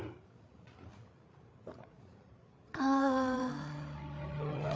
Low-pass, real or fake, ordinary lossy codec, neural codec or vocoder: none; fake; none; codec, 16 kHz, 8 kbps, FreqCodec, larger model